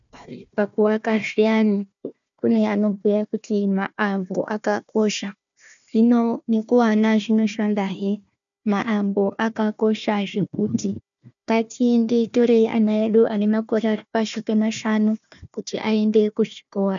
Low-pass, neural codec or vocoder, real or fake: 7.2 kHz; codec, 16 kHz, 1 kbps, FunCodec, trained on Chinese and English, 50 frames a second; fake